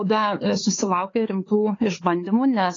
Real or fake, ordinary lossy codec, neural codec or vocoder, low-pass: fake; AAC, 32 kbps; codec, 16 kHz, 4 kbps, X-Codec, HuBERT features, trained on LibriSpeech; 7.2 kHz